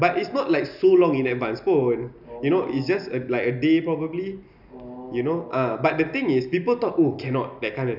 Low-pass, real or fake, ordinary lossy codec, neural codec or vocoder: 5.4 kHz; real; none; none